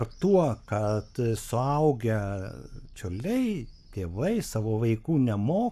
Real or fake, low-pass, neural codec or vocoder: fake; 14.4 kHz; codec, 44.1 kHz, 7.8 kbps, Pupu-Codec